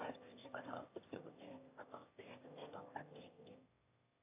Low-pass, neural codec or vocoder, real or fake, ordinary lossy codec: 3.6 kHz; autoencoder, 22.05 kHz, a latent of 192 numbers a frame, VITS, trained on one speaker; fake; AAC, 24 kbps